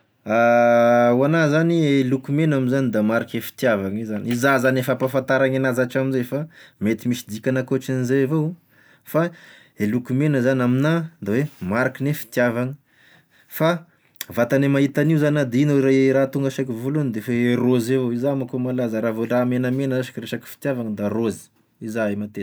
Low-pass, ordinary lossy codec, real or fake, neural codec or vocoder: none; none; real; none